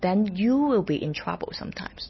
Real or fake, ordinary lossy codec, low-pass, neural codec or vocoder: real; MP3, 24 kbps; 7.2 kHz; none